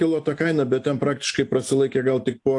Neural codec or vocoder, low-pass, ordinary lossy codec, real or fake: none; 10.8 kHz; AAC, 64 kbps; real